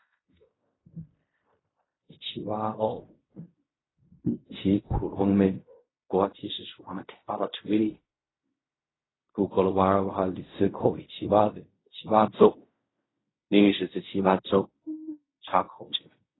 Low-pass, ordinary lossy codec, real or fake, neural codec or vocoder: 7.2 kHz; AAC, 16 kbps; fake; codec, 16 kHz in and 24 kHz out, 0.4 kbps, LongCat-Audio-Codec, fine tuned four codebook decoder